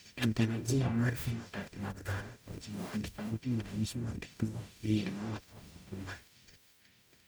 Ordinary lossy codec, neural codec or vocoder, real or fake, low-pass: none; codec, 44.1 kHz, 0.9 kbps, DAC; fake; none